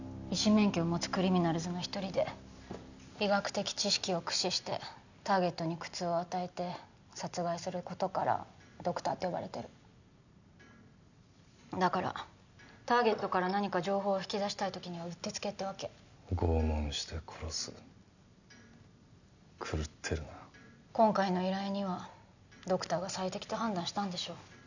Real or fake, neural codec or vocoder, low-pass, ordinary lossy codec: real; none; 7.2 kHz; none